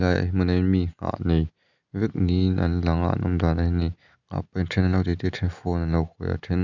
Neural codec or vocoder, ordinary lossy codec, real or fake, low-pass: none; none; real; 7.2 kHz